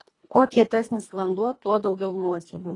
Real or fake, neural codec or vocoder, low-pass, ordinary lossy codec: fake; codec, 24 kHz, 1.5 kbps, HILCodec; 10.8 kHz; AAC, 32 kbps